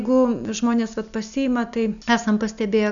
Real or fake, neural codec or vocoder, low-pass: real; none; 7.2 kHz